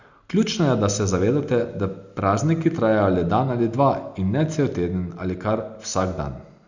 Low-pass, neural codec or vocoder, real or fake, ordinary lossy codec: 7.2 kHz; none; real; Opus, 64 kbps